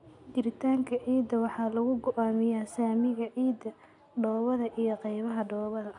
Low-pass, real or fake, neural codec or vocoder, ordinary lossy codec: 10.8 kHz; real; none; none